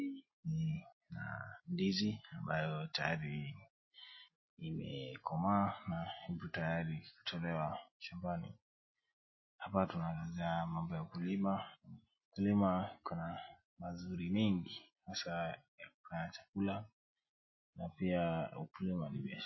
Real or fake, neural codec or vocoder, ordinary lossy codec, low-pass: real; none; MP3, 24 kbps; 7.2 kHz